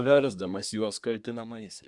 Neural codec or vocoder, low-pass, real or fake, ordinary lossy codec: codec, 24 kHz, 1 kbps, SNAC; 10.8 kHz; fake; Opus, 64 kbps